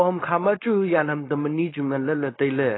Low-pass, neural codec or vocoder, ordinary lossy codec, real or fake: 7.2 kHz; codec, 16 kHz, 4.8 kbps, FACodec; AAC, 16 kbps; fake